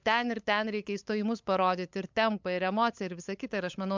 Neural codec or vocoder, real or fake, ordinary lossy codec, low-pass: codec, 16 kHz, 8 kbps, FunCodec, trained on LibriTTS, 25 frames a second; fake; MP3, 64 kbps; 7.2 kHz